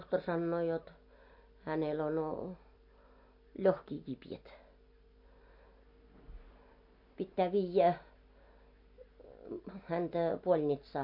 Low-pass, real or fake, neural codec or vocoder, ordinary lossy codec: 5.4 kHz; real; none; MP3, 32 kbps